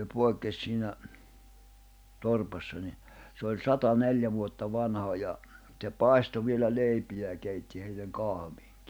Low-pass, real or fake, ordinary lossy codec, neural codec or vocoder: none; real; none; none